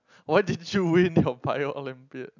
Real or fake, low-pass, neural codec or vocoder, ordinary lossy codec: real; 7.2 kHz; none; none